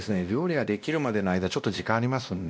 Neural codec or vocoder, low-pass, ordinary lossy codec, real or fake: codec, 16 kHz, 1 kbps, X-Codec, WavLM features, trained on Multilingual LibriSpeech; none; none; fake